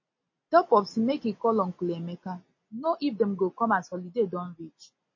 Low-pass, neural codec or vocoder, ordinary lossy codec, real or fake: 7.2 kHz; none; MP3, 32 kbps; real